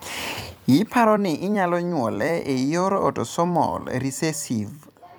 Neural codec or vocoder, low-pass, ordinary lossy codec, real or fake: vocoder, 44.1 kHz, 128 mel bands every 256 samples, BigVGAN v2; none; none; fake